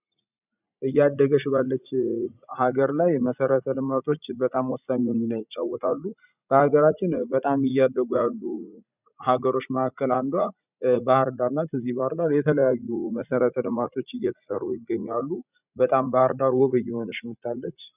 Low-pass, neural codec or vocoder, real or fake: 3.6 kHz; vocoder, 44.1 kHz, 80 mel bands, Vocos; fake